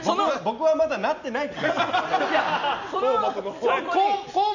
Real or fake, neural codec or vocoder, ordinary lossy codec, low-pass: real; none; none; 7.2 kHz